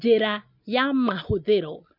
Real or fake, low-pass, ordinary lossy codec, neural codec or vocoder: real; 5.4 kHz; none; none